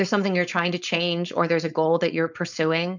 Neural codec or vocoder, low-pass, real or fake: codec, 16 kHz, 4.8 kbps, FACodec; 7.2 kHz; fake